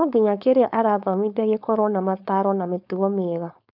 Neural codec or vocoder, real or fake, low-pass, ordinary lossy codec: codec, 16 kHz, 4.8 kbps, FACodec; fake; 5.4 kHz; none